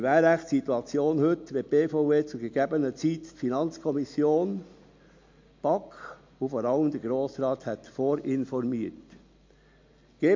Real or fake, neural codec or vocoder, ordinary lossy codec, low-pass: real; none; MP3, 48 kbps; 7.2 kHz